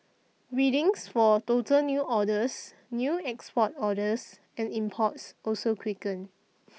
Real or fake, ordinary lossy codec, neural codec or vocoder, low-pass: real; none; none; none